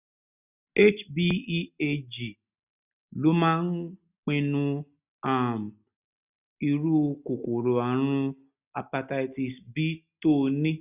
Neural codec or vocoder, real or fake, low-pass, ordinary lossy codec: none; real; 3.6 kHz; none